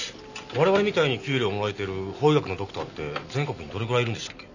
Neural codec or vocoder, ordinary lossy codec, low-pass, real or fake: none; none; 7.2 kHz; real